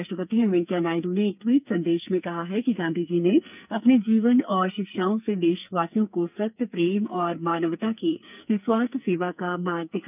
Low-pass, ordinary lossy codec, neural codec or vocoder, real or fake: 3.6 kHz; none; codec, 44.1 kHz, 2.6 kbps, SNAC; fake